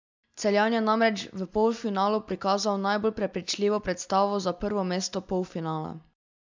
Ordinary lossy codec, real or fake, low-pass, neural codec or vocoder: MP3, 64 kbps; real; 7.2 kHz; none